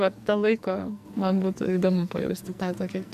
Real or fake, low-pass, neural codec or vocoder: fake; 14.4 kHz; codec, 44.1 kHz, 2.6 kbps, SNAC